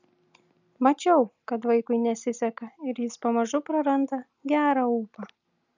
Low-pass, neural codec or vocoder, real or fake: 7.2 kHz; none; real